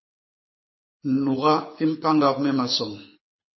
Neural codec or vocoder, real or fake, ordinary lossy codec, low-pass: codec, 24 kHz, 6 kbps, HILCodec; fake; MP3, 24 kbps; 7.2 kHz